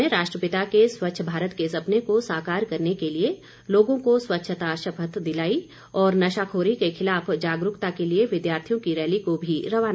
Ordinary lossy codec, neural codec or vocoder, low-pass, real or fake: none; none; none; real